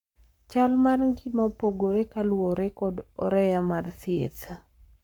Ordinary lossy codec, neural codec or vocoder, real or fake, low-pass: none; codec, 44.1 kHz, 7.8 kbps, Pupu-Codec; fake; 19.8 kHz